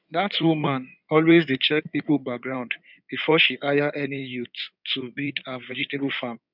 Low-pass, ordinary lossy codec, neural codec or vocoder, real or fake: 5.4 kHz; none; codec, 16 kHz in and 24 kHz out, 2.2 kbps, FireRedTTS-2 codec; fake